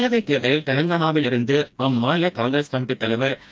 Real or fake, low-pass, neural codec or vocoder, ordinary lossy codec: fake; none; codec, 16 kHz, 1 kbps, FreqCodec, smaller model; none